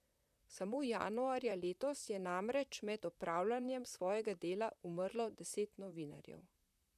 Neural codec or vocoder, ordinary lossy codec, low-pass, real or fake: vocoder, 44.1 kHz, 128 mel bands, Pupu-Vocoder; none; 14.4 kHz; fake